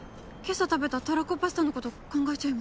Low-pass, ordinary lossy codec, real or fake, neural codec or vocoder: none; none; real; none